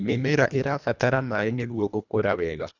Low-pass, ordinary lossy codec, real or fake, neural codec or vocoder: 7.2 kHz; none; fake; codec, 24 kHz, 1.5 kbps, HILCodec